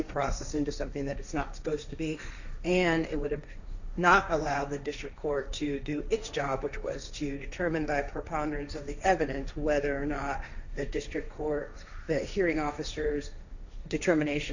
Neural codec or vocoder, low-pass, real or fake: codec, 16 kHz, 1.1 kbps, Voila-Tokenizer; 7.2 kHz; fake